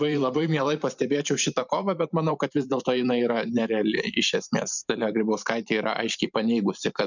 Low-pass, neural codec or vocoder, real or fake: 7.2 kHz; vocoder, 44.1 kHz, 128 mel bands every 512 samples, BigVGAN v2; fake